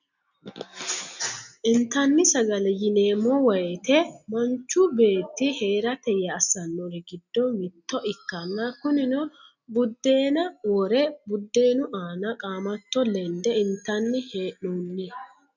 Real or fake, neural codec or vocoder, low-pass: real; none; 7.2 kHz